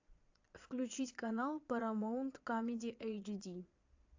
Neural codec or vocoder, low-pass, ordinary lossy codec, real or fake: vocoder, 22.05 kHz, 80 mel bands, Vocos; 7.2 kHz; AAC, 48 kbps; fake